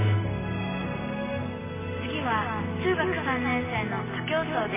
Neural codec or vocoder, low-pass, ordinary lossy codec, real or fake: none; 3.6 kHz; MP3, 16 kbps; real